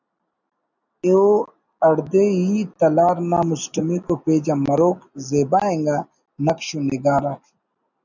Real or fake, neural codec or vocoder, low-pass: real; none; 7.2 kHz